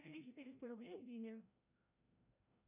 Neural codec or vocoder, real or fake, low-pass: codec, 16 kHz, 0.5 kbps, FreqCodec, larger model; fake; 3.6 kHz